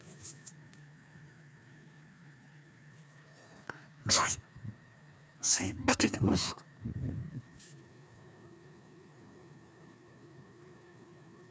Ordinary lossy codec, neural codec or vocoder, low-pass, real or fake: none; codec, 16 kHz, 2 kbps, FreqCodec, larger model; none; fake